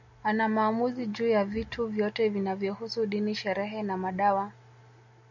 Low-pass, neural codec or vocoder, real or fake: 7.2 kHz; none; real